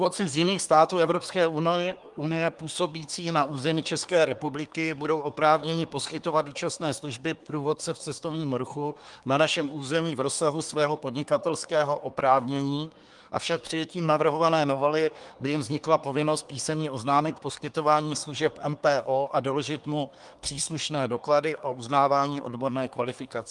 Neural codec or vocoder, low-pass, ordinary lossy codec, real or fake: codec, 24 kHz, 1 kbps, SNAC; 10.8 kHz; Opus, 32 kbps; fake